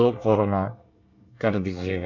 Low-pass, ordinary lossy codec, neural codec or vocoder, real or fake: 7.2 kHz; none; codec, 24 kHz, 1 kbps, SNAC; fake